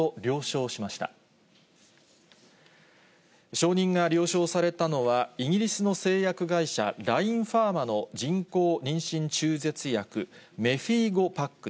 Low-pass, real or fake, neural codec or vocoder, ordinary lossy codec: none; real; none; none